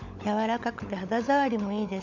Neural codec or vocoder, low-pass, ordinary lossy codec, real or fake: codec, 16 kHz, 16 kbps, FunCodec, trained on LibriTTS, 50 frames a second; 7.2 kHz; none; fake